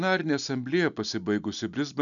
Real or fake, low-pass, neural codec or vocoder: real; 7.2 kHz; none